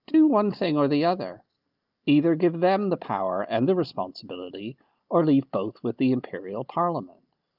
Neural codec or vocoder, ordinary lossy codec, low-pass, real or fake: none; Opus, 24 kbps; 5.4 kHz; real